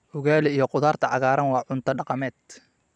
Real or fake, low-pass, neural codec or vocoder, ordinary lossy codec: fake; 9.9 kHz; vocoder, 44.1 kHz, 128 mel bands every 256 samples, BigVGAN v2; none